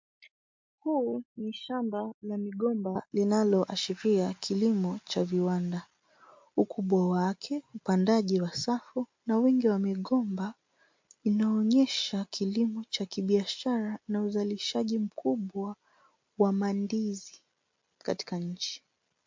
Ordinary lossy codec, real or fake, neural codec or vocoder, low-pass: MP3, 48 kbps; real; none; 7.2 kHz